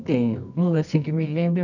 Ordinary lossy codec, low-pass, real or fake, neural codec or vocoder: none; 7.2 kHz; fake; codec, 24 kHz, 0.9 kbps, WavTokenizer, medium music audio release